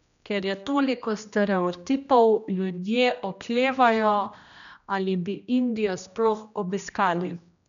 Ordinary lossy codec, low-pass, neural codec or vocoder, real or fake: none; 7.2 kHz; codec, 16 kHz, 1 kbps, X-Codec, HuBERT features, trained on general audio; fake